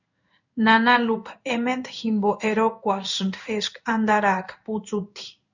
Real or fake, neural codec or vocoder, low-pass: fake; codec, 16 kHz in and 24 kHz out, 1 kbps, XY-Tokenizer; 7.2 kHz